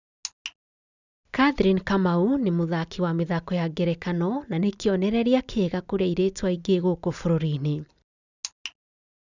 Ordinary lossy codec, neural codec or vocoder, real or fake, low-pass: none; none; real; 7.2 kHz